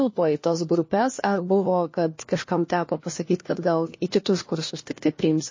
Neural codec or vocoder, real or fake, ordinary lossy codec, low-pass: codec, 16 kHz, 1 kbps, FunCodec, trained on LibriTTS, 50 frames a second; fake; MP3, 32 kbps; 7.2 kHz